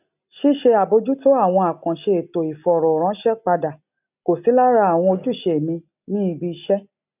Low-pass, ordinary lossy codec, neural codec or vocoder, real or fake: 3.6 kHz; none; none; real